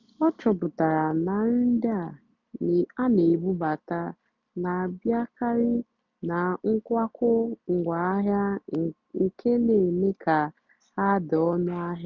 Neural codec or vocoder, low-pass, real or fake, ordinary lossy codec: none; 7.2 kHz; real; none